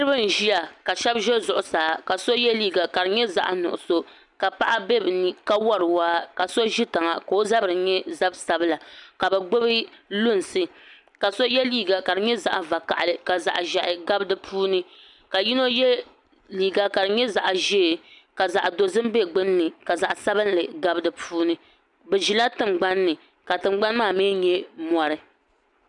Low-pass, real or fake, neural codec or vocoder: 10.8 kHz; real; none